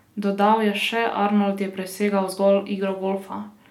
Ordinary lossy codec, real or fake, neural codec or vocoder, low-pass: none; real; none; 19.8 kHz